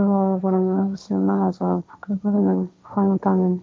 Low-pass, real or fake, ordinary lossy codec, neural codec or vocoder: none; fake; none; codec, 16 kHz, 1.1 kbps, Voila-Tokenizer